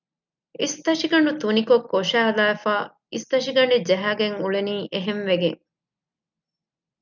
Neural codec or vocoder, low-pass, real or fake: none; 7.2 kHz; real